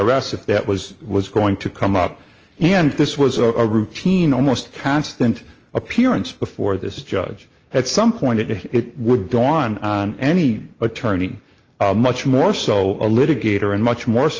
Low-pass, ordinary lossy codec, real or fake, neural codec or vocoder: 7.2 kHz; Opus, 24 kbps; real; none